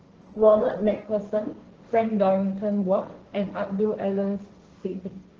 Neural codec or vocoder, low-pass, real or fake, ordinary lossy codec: codec, 16 kHz, 1.1 kbps, Voila-Tokenizer; 7.2 kHz; fake; Opus, 16 kbps